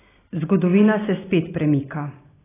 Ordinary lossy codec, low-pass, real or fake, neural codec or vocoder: AAC, 16 kbps; 3.6 kHz; real; none